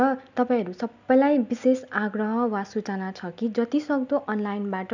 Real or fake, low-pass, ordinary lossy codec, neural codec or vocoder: real; 7.2 kHz; none; none